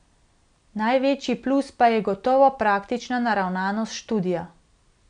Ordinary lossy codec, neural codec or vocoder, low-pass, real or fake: none; none; 9.9 kHz; real